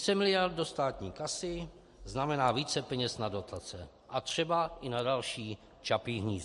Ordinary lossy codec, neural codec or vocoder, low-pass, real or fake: MP3, 48 kbps; none; 14.4 kHz; real